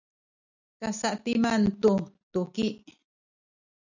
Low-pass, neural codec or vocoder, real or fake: 7.2 kHz; none; real